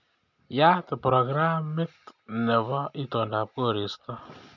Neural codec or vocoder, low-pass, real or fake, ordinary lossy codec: none; 7.2 kHz; real; none